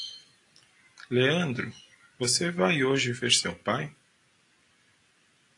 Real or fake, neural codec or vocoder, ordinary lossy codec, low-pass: real; none; AAC, 48 kbps; 10.8 kHz